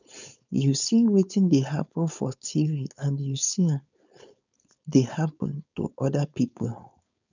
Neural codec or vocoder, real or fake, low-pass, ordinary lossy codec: codec, 16 kHz, 4.8 kbps, FACodec; fake; 7.2 kHz; none